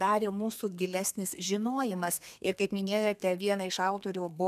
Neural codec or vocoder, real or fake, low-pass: codec, 32 kHz, 1.9 kbps, SNAC; fake; 14.4 kHz